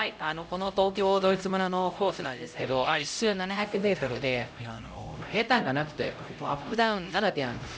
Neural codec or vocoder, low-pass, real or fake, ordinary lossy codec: codec, 16 kHz, 0.5 kbps, X-Codec, HuBERT features, trained on LibriSpeech; none; fake; none